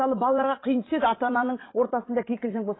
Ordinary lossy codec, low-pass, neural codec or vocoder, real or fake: AAC, 16 kbps; 7.2 kHz; vocoder, 44.1 kHz, 80 mel bands, Vocos; fake